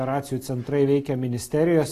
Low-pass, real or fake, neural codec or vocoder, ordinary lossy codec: 14.4 kHz; real; none; AAC, 48 kbps